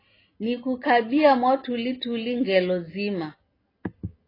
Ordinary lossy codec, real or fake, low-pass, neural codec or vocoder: AAC, 24 kbps; real; 5.4 kHz; none